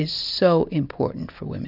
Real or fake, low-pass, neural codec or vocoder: real; 5.4 kHz; none